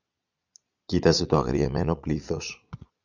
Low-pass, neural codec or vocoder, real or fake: 7.2 kHz; vocoder, 22.05 kHz, 80 mel bands, Vocos; fake